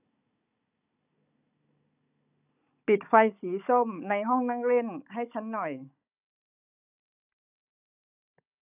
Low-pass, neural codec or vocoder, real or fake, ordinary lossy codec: 3.6 kHz; codec, 16 kHz, 16 kbps, FunCodec, trained on Chinese and English, 50 frames a second; fake; none